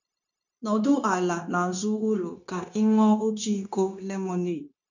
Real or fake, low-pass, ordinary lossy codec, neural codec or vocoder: fake; 7.2 kHz; none; codec, 16 kHz, 0.9 kbps, LongCat-Audio-Codec